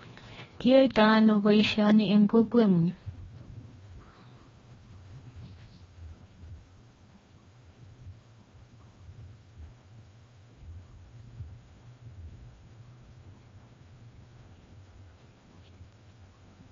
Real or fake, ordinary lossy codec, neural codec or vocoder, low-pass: fake; AAC, 24 kbps; codec, 16 kHz, 1 kbps, FreqCodec, larger model; 7.2 kHz